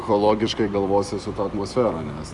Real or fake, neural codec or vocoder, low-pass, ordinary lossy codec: fake; vocoder, 44.1 kHz, 128 mel bands every 256 samples, BigVGAN v2; 10.8 kHz; Opus, 64 kbps